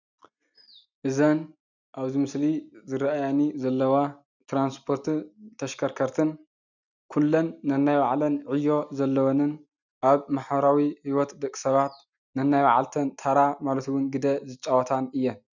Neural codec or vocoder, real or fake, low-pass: none; real; 7.2 kHz